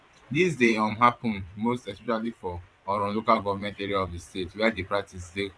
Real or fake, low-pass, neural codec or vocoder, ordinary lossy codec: fake; none; vocoder, 22.05 kHz, 80 mel bands, WaveNeXt; none